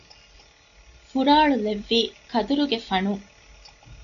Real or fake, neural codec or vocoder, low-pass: real; none; 7.2 kHz